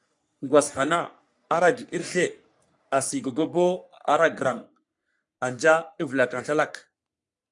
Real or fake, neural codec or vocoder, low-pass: fake; codec, 44.1 kHz, 3.4 kbps, Pupu-Codec; 10.8 kHz